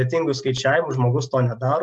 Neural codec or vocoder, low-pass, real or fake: none; 10.8 kHz; real